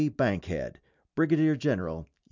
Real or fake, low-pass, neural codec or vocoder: real; 7.2 kHz; none